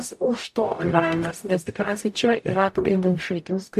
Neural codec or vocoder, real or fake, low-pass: codec, 44.1 kHz, 0.9 kbps, DAC; fake; 14.4 kHz